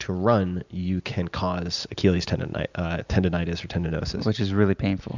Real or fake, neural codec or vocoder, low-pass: real; none; 7.2 kHz